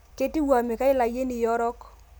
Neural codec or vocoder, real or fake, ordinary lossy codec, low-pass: none; real; none; none